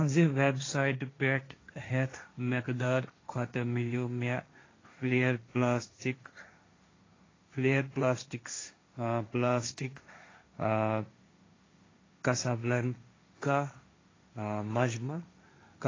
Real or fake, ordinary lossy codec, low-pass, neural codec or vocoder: fake; AAC, 32 kbps; 7.2 kHz; codec, 16 kHz, 1.1 kbps, Voila-Tokenizer